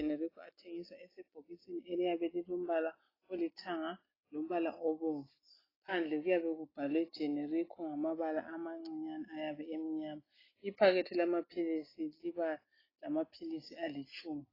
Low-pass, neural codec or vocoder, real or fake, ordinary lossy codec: 5.4 kHz; none; real; AAC, 24 kbps